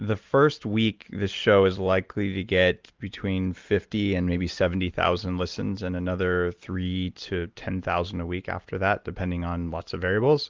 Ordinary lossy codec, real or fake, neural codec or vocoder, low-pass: Opus, 32 kbps; real; none; 7.2 kHz